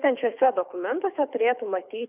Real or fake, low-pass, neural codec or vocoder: fake; 3.6 kHz; codec, 24 kHz, 6 kbps, HILCodec